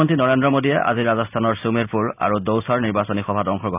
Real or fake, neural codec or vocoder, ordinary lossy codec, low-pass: real; none; none; 3.6 kHz